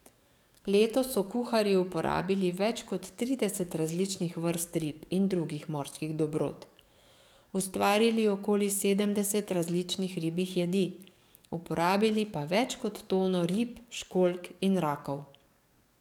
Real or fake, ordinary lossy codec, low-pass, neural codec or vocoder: fake; none; 19.8 kHz; codec, 44.1 kHz, 7.8 kbps, DAC